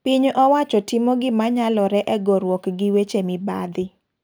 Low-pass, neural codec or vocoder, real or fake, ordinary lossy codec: none; none; real; none